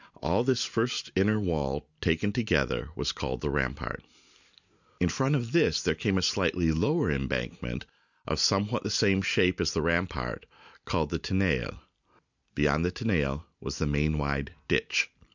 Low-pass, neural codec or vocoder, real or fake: 7.2 kHz; none; real